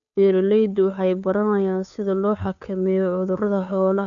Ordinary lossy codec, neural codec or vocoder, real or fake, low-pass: none; codec, 16 kHz, 2 kbps, FunCodec, trained on Chinese and English, 25 frames a second; fake; 7.2 kHz